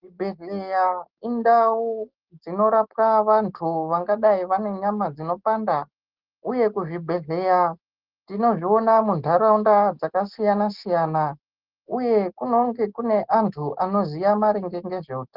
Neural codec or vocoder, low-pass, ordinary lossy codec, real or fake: none; 5.4 kHz; Opus, 16 kbps; real